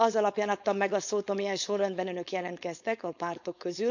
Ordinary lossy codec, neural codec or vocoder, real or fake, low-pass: none; codec, 16 kHz, 4.8 kbps, FACodec; fake; 7.2 kHz